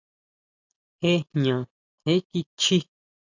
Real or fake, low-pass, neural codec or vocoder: real; 7.2 kHz; none